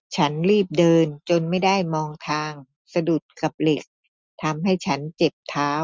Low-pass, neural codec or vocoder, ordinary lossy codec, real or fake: none; none; none; real